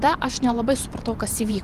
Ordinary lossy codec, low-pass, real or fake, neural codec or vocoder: Opus, 24 kbps; 14.4 kHz; fake; vocoder, 44.1 kHz, 128 mel bands every 512 samples, BigVGAN v2